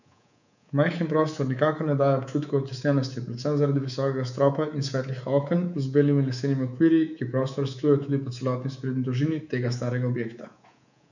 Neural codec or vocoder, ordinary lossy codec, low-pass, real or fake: codec, 24 kHz, 3.1 kbps, DualCodec; none; 7.2 kHz; fake